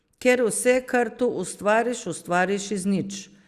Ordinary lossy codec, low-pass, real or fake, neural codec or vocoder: Opus, 64 kbps; 14.4 kHz; real; none